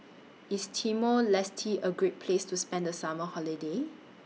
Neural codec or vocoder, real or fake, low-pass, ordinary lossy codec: none; real; none; none